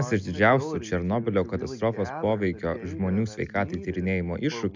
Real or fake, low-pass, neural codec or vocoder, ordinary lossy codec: real; 7.2 kHz; none; MP3, 64 kbps